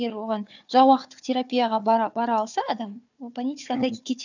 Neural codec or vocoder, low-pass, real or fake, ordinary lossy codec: codec, 16 kHz, 4 kbps, FunCodec, trained on Chinese and English, 50 frames a second; 7.2 kHz; fake; none